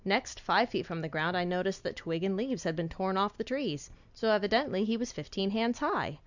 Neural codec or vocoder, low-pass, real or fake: none; 7.2 kHz; real